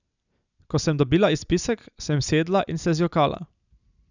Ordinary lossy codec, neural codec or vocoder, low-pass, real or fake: none; none; 7.2 kHz; real